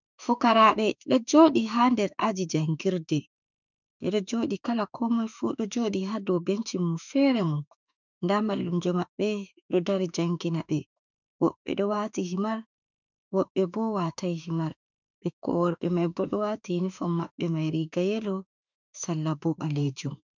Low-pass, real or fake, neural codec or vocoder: 7.2 kHz; fake; autoencoder, 48 kHz, 32 numbers a frame, DAC-VAE, trained on Japanese speech